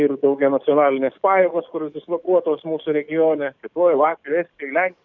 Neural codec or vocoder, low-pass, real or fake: codec, 44.1 kHz, 7.8 kbps, DAC; 7.2 kHz; fake